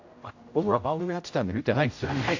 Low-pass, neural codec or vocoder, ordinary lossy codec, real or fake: 7.2 kHz; codec, 16 kHz, 0.5 kbps, X-Codec, HuBERT features, trained on general audio; AAC, 48 kbps; fake